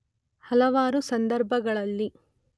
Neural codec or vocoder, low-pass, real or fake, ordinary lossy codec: none; none; real; none